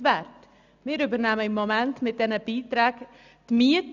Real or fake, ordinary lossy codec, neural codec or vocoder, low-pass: real; none; none; 7.2 kHz